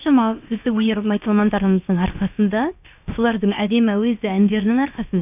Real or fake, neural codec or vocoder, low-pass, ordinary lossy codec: fake; codec, 16 kHz, about 1 kbps, DyCAST, with the encoder's durations; 3.6 kHz; none